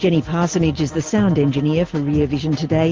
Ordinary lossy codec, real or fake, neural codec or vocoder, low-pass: Opus, 16 kbps; real; none; 7.2 kHz